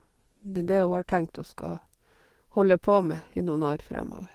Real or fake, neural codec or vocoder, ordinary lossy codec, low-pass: fake; codec, 44.1 kHz, 2.6 kbps, SNAC; Opus, 24 kbps; 14.4 kHz